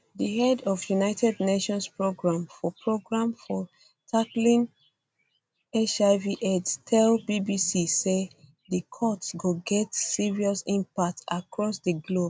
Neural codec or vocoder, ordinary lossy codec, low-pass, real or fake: none; none; none; real